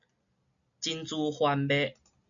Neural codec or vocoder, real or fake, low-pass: none; real; 7.2 kHz